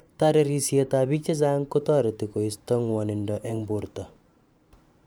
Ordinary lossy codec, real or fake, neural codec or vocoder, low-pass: none; fake; vocoder, 44.1 kHz, 128 mel bands every 512 samples, BigVGAN v2; none